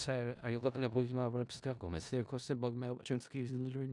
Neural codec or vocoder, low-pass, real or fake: codec, 16 kHz in and 24 kHz out, 0.4 kbps, LongCat-Audio-Codec, four codebook decoder; 10.8 kHz; fake